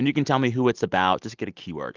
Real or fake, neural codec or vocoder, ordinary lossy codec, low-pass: real; none; Opus, 32 kbps; 7.2 kHz